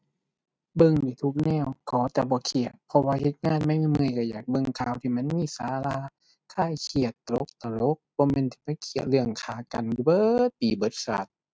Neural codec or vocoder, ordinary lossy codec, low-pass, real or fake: none; none; none; real